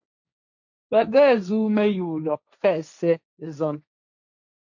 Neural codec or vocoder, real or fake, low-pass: codec, 16 kHz, 1.1 kbps, Voila-Tokenizer; fake; 7.2 kHz